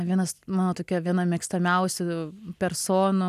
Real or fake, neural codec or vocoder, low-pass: real; none; 14.4 kHz